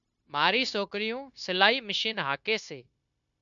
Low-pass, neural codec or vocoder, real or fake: 7.2 kHz; codec, 16 kHz, 0.9 kbps, LongCat-Audio-Codec; fake